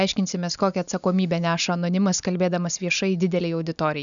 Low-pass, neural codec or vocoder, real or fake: 7.2 kHz; none; real